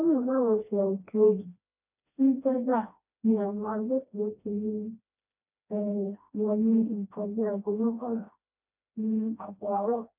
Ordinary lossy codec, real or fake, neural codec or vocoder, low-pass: none; fake; codec, 16 kHz, 1 kbps, FreqCodec, smaller model; 3.6 kHz